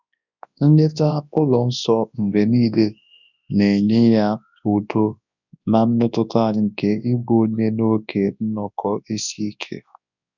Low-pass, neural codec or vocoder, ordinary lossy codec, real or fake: 7.2 kHz; codec, 24 kHz, 0.9 kbps, WavTokenizer, large speech release; none; fake